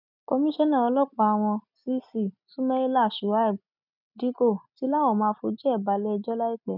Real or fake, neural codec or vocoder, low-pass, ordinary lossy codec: real; none; 5.4 kHz; none